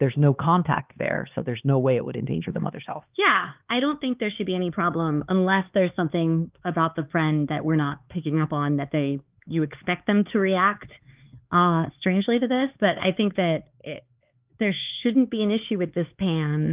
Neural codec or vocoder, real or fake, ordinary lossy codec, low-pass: codec, 16 kHz, 4 kbps, X-Codec, HuBERT features, trained on LibriSpeech; fake; Opus, 32 kbps; 3.6 kHz